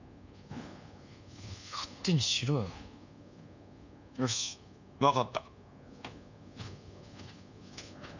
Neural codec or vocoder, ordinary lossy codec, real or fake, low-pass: codec, 24 kHz, 1.2 kbps, DualCodec; none; fake; 7.2 kHz